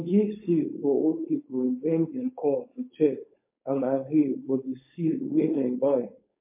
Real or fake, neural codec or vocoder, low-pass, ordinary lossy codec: fake; codec, 16 kHz, 4.8 kbps, FACodec; 3.6 kHz; MP3, 24 kbps